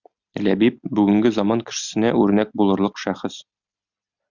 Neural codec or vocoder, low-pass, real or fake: none; 7.2 kHz; real